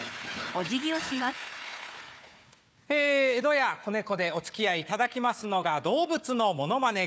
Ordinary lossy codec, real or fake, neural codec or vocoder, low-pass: none; fake; codec, 16 kHz, 4 kbps, FunCodec, trained on Chinese and English, 50 frames a second; none